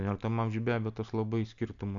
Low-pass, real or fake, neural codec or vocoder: 7.2 kHz; real; none